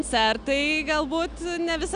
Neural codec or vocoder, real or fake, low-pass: none; real; 9.9 kHz